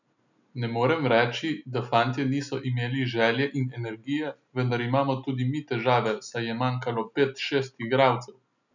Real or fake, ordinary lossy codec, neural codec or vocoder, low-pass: real; none; none; 7.2 kHz